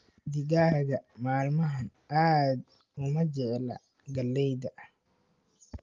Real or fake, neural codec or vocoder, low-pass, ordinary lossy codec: real; none; 7.2 kHz; Opus, 32 kbps